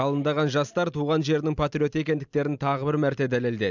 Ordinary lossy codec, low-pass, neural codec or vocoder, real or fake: none; 7.2 kHz; none; real